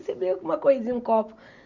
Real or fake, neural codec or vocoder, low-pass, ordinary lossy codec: real; none; 7.2 kHz; Opus, 64 kbps